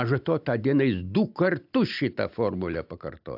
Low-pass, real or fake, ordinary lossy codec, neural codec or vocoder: 5.4 kHz; real; AAC, 48 kbps; none